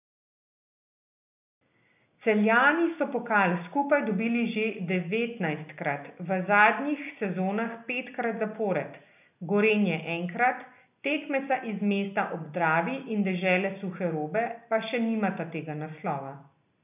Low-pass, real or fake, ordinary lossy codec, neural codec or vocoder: 3.6 kHz; real; none; none